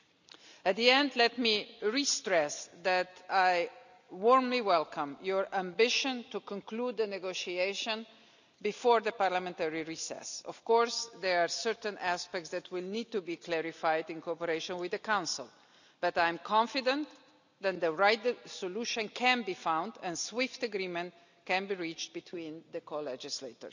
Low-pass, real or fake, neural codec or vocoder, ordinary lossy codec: 7.2 kHz; real; none; none